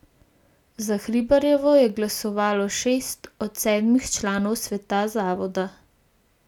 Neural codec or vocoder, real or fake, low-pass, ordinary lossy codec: none; real; 19.8 kHz; none